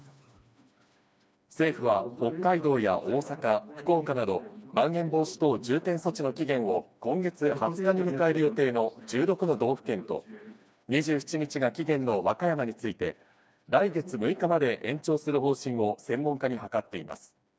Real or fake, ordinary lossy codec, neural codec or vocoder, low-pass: fake; none; codec, 16 kHz, 2 kbps, FreqCodec, smaller model; none